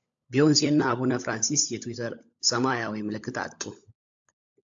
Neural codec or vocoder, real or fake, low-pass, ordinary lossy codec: codec, 16 kHz, 16 kbps, FunCodec, trained on LibriTTS, 50 frames a second; fake; 7.2 kHz; AAC, 64 kbps